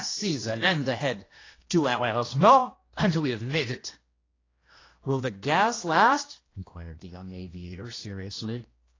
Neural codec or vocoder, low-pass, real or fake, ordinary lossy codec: codec, 16 kHz, 1 kbps, X-Codec, HuBERT features, trained on general audio; 7.2 kHz; fake; AAC, 32 kbps